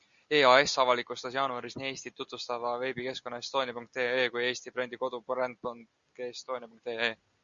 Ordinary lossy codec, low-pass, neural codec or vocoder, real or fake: Opus, 64 kbps; 7.2 kHz; none; real